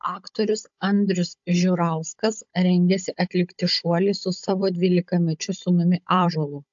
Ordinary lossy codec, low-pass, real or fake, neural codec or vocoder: AAC, 64 kbps; 7.2 kHz; fake; codec, 16 kHz, 16 kbps, FunCodec, trained on Chinese and English, 50 frames a second